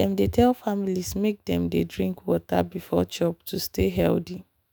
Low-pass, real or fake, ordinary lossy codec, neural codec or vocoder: none; fake; none; autoencoder, 48 kHz, 128 numbers a frame, DAC-VAE, trained on Japanese speech